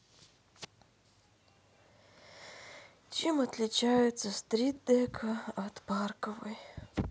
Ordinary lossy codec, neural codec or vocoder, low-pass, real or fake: none; none; none; real